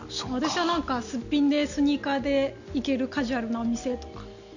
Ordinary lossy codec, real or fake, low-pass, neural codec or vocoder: none; real; 7.2 kHz; none